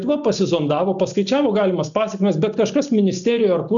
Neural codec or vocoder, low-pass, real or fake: none; 7.2 kHz; real